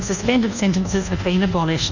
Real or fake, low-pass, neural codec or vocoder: fake; 7.2 kHz; codec, 24 kHz, 1.2 kbps, DualCodec